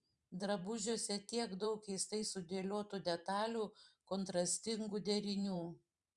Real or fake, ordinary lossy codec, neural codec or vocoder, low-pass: fake; Opus, 64 kbps; vocoder, 44.1 kHz, 128 mel bands every 512 samples, BigVGAN v2; 10.8 kHz